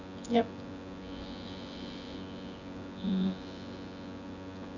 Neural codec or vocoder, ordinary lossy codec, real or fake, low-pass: vocoder, 24 kHz, 100 mel bands, Vocos; none; fake; 7.2 kHz